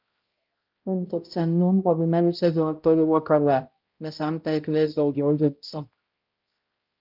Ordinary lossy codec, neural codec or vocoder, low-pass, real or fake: Opus, 32 kbps; codec, 16 kHz, 0.5 kbps, X-Codec, HuBERT features, trained on balanced general audio; 5.4 kHz; fake